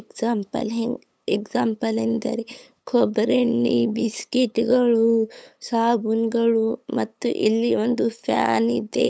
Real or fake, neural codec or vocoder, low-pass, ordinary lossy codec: fake; codec, 16 kHz, 8 kbps, FunCodec, trained on LibriTTS, 25 frames a second; none; none